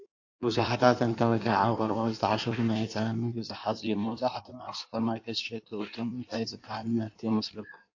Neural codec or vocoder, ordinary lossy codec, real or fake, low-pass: codec, 16 kHz in and 24 kHz out, 1.1 kbps, FireRedTTS-2 codec; AAC, 48 kbps; fake; 7.2 kHz